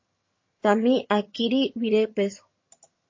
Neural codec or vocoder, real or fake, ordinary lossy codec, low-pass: vocoder, 22.05 kHz, 80 mel bands, HiFi-GAN; fake; MP3, 32 kbps; 7.2 kHz